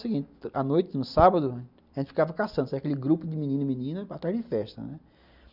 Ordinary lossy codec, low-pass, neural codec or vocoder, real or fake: none; 5.4 kHz; none; real